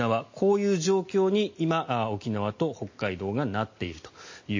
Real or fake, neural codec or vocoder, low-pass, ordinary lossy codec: real; none; 7.2 kHz; MP3, 32 kbps